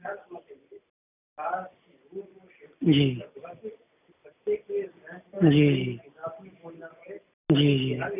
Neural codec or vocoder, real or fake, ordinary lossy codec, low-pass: none; real; none; 3.6 kHz